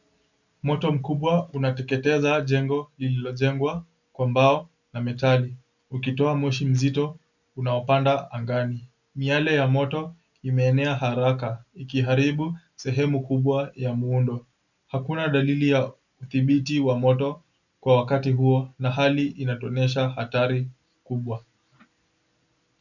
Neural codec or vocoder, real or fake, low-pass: none; real; 7.2 kHz